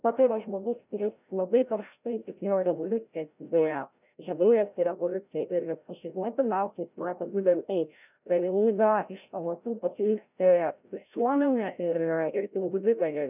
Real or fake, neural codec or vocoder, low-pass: fake; codec, 16 kHz, 0.5 kbps, FreqCodec, larger model; 3.6 kHz